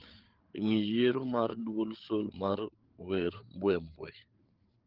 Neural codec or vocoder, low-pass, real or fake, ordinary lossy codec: codec, 16 kHz, 8 kbps, FreqCodec, larger model; 5.4 kHz; fake; Opus, 16 kbps